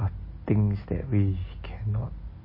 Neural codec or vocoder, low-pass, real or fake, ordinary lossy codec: none; 5.4 kHz; real; MP3, 24 kbps